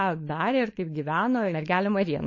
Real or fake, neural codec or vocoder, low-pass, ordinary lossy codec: fake; codec, 16 kHz, 4.8 kbps, FACodec; 7.2 kHz; MP3, 32 kbps